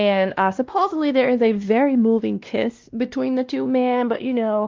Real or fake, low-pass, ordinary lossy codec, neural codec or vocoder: fake; 7.2 kHz; Opus, 24 kbps; codec, 16 kHz, 1 kbps, X-Codec, WavLM features, trained on Multilingual LibriSpeech